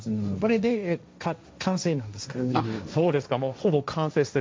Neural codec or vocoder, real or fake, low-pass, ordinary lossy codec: codec, 16 kHz, 1.1 kbps, Voila-Tokenizer; fake; none; none